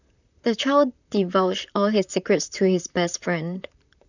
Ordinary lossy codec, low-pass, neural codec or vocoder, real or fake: none; 7.2 kHz; vocoder, 44.1 kHz, 128 mel bands, Pupu-Vocoder; fake